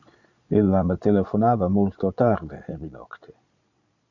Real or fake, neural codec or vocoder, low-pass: fake; vocoder, 22.05 kHz, 80 mel bands, Vocos; 7.2 kHz